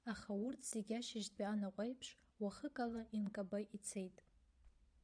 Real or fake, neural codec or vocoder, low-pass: fake; vocoder, 22.05 kHz, 80 mel bands, Vocos; 9.9 kHz